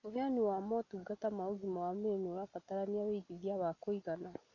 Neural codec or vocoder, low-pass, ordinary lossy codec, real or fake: none; 7.2 kHz; none; real